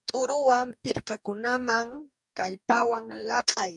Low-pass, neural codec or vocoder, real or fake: 10.8 kHz; codec, 44.1 kHz, 2.6 kbps, DAC; fake